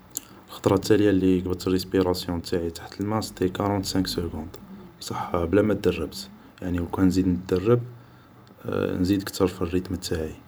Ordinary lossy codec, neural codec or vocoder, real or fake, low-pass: none; vocoder, 44.1 kHz, 128 mel bands every 512 samples, BigVGAN v2; fake; none